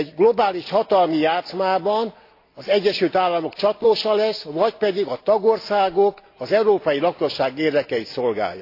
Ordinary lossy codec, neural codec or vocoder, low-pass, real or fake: AAC, 32 kbps; none; 5.4 kHz; real